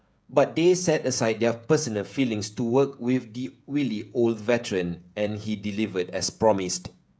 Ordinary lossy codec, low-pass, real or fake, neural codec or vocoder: none; none; fake; codec, 16 kHz, 16 kbps, FreqCodec, smaller model